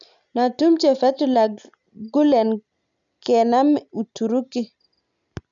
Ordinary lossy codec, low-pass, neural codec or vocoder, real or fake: none; 7.2 kHz; none; real